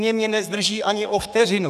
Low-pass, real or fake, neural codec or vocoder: 14.4 kHz; fake; codec, 32 kHz, 1.9 kbps, SNAC